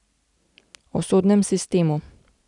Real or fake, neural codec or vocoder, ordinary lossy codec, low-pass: real; none; none; 10.8 kHz